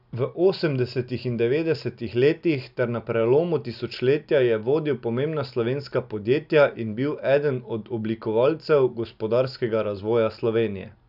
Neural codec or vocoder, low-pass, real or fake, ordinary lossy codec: none; 5.4 kHz; real; none